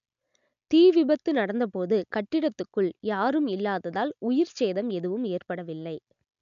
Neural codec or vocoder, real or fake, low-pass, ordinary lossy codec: none; real; 7.2 kHz; none